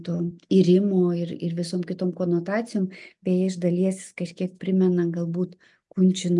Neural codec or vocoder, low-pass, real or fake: none; 10.8 kHz; real